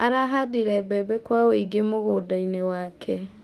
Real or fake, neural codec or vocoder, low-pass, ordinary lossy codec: fake; autoencoder, 48 kHz, 32 numbers a frame, DAC-VAE, trained on Japanese speech; 14.4 kHz; Opus, 32 kbps